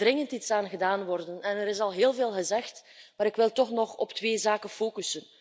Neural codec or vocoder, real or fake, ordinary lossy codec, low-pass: none; real; none; none